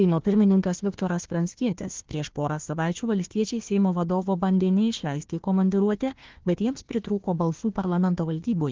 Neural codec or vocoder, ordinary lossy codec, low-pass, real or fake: codec, 16 kHz, 1 kbps, FunCodec, trained on Chinese and English, 50 frames a second; Opus, 16 kbps; 7.2 kHz; fake